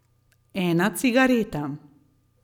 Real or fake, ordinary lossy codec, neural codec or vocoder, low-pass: real; none; none; 19.8 kHz